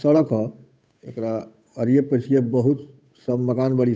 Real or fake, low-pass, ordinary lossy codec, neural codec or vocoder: fake; none; none; codec, 16 kHz, 8 kbps, FunCodec, trained on Chinese and English, 25 frames a second